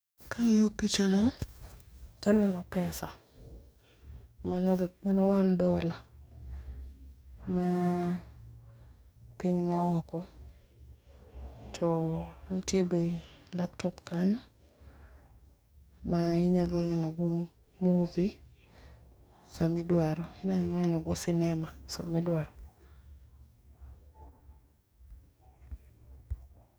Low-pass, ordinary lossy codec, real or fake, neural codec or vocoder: none; none; fake; codec, 44.1 kHz, 2.6 kbps, DAC